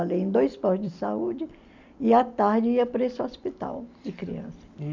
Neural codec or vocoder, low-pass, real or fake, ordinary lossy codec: none; 7.2 kHz; real; MP3, 64 kbps